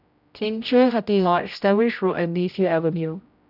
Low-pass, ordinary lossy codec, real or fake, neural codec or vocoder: 5.4 kHz; none; fake; codec, 16 kHz, 0.5 kbps, X-Codec, HuBERT features, trained on general audio